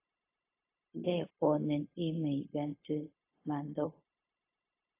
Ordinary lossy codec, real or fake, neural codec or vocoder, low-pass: AAC, 24 kbps; fake; codec, 16 kHz, 0.4 kbps, LongCat-Audio-Codec; 3.6 kHz